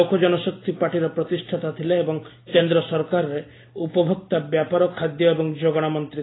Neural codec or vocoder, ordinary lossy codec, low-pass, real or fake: none; AAC, 16 kbps; 7.2 kHz; real